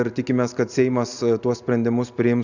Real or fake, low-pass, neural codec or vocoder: real; 7.2 kHz; none